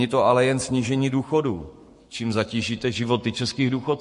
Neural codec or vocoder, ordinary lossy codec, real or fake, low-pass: codec, 44.1 kHz, 7.8 kbps, Pupu-Codec; MP3, 48 kbps; fake; 14.4 kHz